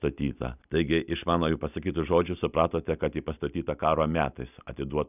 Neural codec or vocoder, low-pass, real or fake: none; 3.6 kHz; real